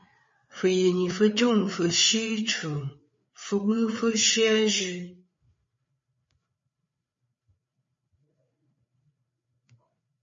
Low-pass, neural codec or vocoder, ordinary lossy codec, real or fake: 7.2 kHz; codec, 16 kHz, 4 kbps, FreqCodec, larger model; MP3, 32 kbps; fake